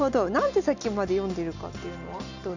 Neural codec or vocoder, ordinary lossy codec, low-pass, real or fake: none; none; 7.2 kHz; real